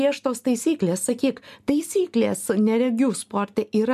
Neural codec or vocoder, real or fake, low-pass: none; real; 14.4 kHz